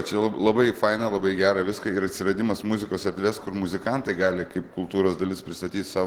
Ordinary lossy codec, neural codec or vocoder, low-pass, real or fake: Opus, 16 kbps; vocoder, 44.1 kHz, 128 mel bands every 512 samples, BigVGAN v2; 19.8 kHz; fake